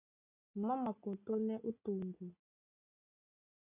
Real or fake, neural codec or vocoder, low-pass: real; none; 3.6 kHz